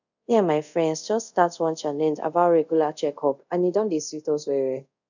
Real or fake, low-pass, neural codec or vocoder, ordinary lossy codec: fake; 7.2 kHz; codec, 24 kHz, 0.5 kbps, DualCodec; none